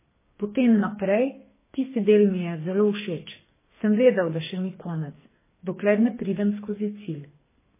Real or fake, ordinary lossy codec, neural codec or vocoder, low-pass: fake; MP3, 16 kbps; codec, 44.1 kHz, 2.6 kbps, SNAC; 3.6 kHz